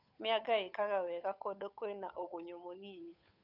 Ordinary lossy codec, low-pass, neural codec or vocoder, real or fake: none; 5.4 kHz; codec, 16 kHz, 8 kbps, FunCodec, trained on Chinese and English, 25 frames a second; fake